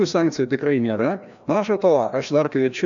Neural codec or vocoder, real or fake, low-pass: codec, 16 kHz, 1 kbps, FreqCodec, larger model; fake; 7.2 kHz